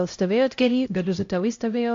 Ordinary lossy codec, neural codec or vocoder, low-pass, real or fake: AAC, 48 kbps; codec, 16 kHz, 0.5 kbps, X-Codec, HuBERT features, trained on LibriSpeech; 7.2 kHz; fake